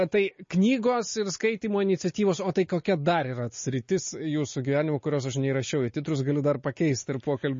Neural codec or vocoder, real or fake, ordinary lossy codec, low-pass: none; real; MP3, 32 kbps; 7.2 kHz